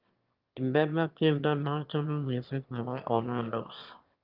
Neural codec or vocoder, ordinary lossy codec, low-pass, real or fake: autoencoder, 22.05 kHz, a latent of 192 numbers a frame, VITS, trained on one speaker; Opus, 24 kbps; 5.4 kHz; fake